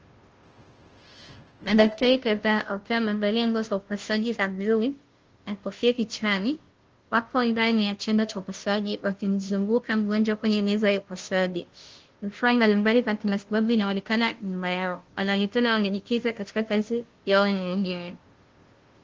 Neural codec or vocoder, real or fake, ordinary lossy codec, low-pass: codec, 16 kHz, 0.5 kbps, FunCodec, trained on Chinese and English, 25 frames a second; fake; Opus, 16 kbps; 7.2 kHz